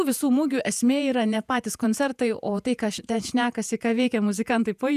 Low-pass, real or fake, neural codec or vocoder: 14.4 kHz; fake; vocoder, 48 kHz, 128 mel bands, Vocos